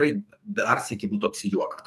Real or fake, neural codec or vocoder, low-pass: fake; codec, 44.1 kHz, 2.6 kbps, SNAC; 14.4 kHz